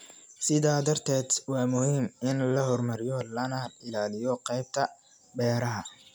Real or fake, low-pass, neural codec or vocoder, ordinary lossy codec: real; none; none; none